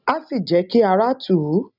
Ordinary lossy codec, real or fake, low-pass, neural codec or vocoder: none; real; 5.4 kHz; none